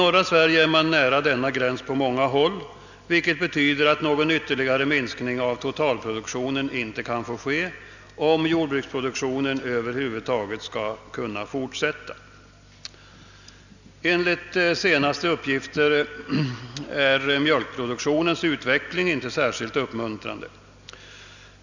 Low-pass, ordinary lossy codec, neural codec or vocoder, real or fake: 7.2 kHz; none; none; real